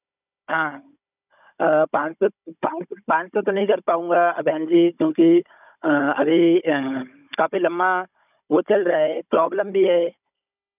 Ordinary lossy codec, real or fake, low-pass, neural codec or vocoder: none; fake; 3.6 kHz; codec, 16 kHz, 16 kbps, FunCodec, trained on Chinese and English, 50 frames a second